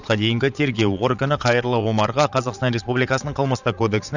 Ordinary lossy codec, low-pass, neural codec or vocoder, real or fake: none; 7.2 kHz; vocoder, 22.05 kHz, 80 mel bands, Vocos; fake